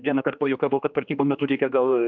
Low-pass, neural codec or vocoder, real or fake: 7.2 kHz; codec, 16 kHz, 2 kbps, X-Codec, HuBERT features, trained on general audio; fake